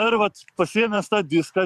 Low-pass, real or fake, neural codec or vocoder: 14.4 kHz; fake; vocoder, 44.1 kHz, 128 mel bands every 512 samples, BigVGAN v2